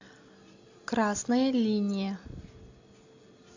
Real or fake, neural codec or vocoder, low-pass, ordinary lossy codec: real; none; 7.2 kHz; AAC, 48 kbps